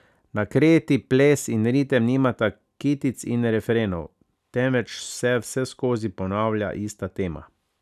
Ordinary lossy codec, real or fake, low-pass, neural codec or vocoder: none; real; 14.4 kHz; none